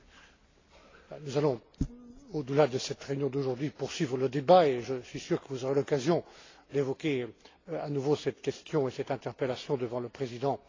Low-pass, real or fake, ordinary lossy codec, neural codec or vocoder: 7.2 kHz; real; AAC, 32 kbps; none